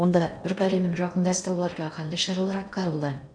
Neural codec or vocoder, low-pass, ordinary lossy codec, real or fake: codec, 16 kHz in and 24 kHz out, 0.6 kbps, FocalCodec, streaming, 4096 codes; 9.9 kHz; none; fake